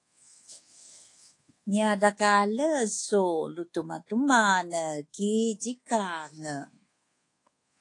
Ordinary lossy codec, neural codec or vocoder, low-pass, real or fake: AAC, 48 kbps; codec, 24 kHz, 1.2 kbps, DualCodec; 10.8 kHz; fake